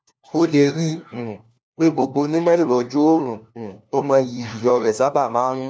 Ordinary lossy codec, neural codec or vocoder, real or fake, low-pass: none; codec, 16 kHz, 1 kbps, FunCodec, trained on LibriTTS, 50 frames a second; fake; none